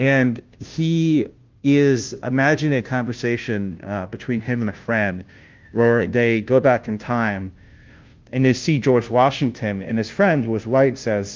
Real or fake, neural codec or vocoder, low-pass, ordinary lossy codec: fake; codec, 16 kHz, 0.5 kbps, FunCodec, trained on Chinese and English, 25 frames a second; 7.2 kHz; Opus, 24 kbps